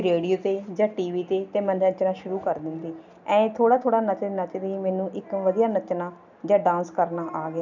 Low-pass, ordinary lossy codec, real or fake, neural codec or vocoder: 7.2 kHz; none; real; none